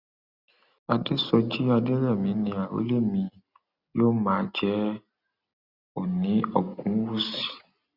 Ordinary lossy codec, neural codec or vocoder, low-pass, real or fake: Opus, 64 kbps; none; 5.4 kHz; real